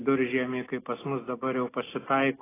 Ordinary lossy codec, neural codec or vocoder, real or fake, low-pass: AAC, 16 kbps; none; real; 3.6 kHz